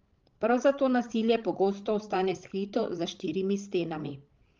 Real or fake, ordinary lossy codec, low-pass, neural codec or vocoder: fake; Opus, 32 kbps; 7.2 kHz; codec, 16 kHz, 16 kbps, FreqCodec, larger model